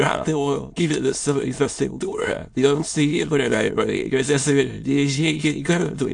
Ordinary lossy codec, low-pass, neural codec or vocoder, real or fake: AAC, 64 kbps; 9.9 kHz; autoencoder, 22.05 kHz, a latent of 192 numbers a frame, VITS, trained on many speakers; fake